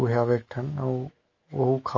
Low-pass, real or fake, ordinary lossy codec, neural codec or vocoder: 7.2 kHz; fake; Opus, 32 kbps; autoencoder, 48 kHz, 128 numbers a frame, DAC-VAE, trained on Japanese speech